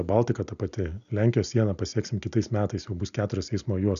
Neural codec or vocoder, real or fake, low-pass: none; real; 7.2 kHz